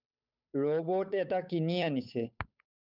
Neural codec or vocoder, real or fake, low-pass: codec, 16 kHz, 8 kbps, FunCodec, trained on Chinese and English, 25 frames a second; fake; 5.4 kHz